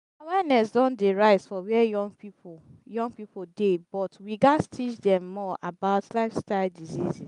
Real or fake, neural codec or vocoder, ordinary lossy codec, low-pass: real; none; none; 10.8 kHz